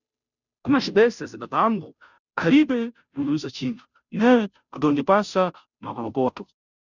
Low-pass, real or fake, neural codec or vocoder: 7.2 kHz; fake; codec, 16 kHz, 0.5 kbps, FunCodec, trained on Chinese and English, 25 frames a second